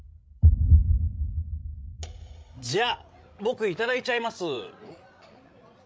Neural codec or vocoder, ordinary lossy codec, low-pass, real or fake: codec, 16 kHz, 16 kbps, FreqCodec, larger model; none; none; fake